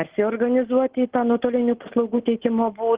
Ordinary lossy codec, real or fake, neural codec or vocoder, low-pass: Opus, 16 kbps; real; none; 3.6 kHz